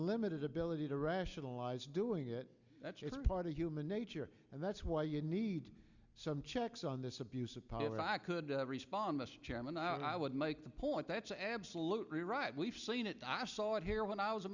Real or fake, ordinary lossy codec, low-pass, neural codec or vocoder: real; Opus, 64 kbps; 7.2 kHz; none